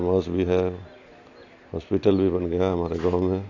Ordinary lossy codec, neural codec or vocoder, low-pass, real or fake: AAC, 48 kbps; none; 7.2 kHz; real